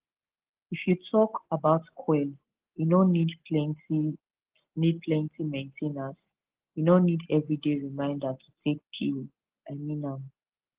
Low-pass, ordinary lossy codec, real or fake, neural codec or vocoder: 3.6 kHz; Opus, 16 kbps; real; none